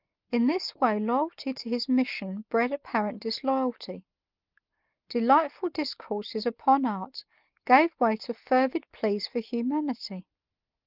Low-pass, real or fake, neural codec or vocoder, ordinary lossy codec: 5.4 kHz; real; none; Opus, 24 kbps